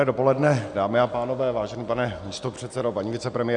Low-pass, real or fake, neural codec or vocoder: 9.9 kHz; real; none